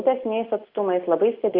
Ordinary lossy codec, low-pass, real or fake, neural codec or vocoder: Opus, 64 kbps; 5.4 kHz; real; none